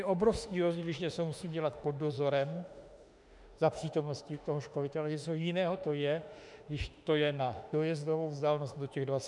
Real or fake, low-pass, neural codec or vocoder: fake; 10.8 kHz; autoencoder, 48 kHz, 32 numbers a frame, DAC-VAE, trained on Japanese speech